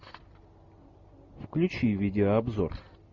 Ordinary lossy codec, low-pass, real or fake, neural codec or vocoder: Opus, 64 kbps; 7.2 kHz; real; none